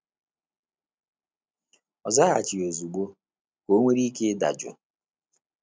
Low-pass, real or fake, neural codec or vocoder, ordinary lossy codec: none; real; none; none